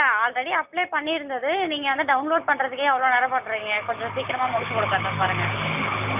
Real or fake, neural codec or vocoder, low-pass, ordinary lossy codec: real; none; 3.6 kHz; none